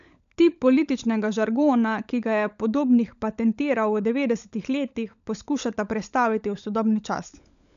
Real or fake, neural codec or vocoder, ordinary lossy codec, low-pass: fake; codec, 16 kHz, 16 kbps, FunCodec, trained on LibriTTS, 50 frames a second; none; 7.2 kHz